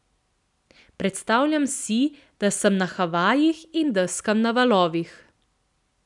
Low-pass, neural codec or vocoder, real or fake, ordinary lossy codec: 10.8 kHz; none; real; none